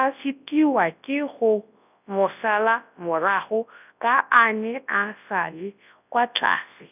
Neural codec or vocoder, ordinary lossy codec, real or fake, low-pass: codec, 24 kHz, 0.9 kbps, WavTokenizer, large speech release; none; fake; 3.6 kHz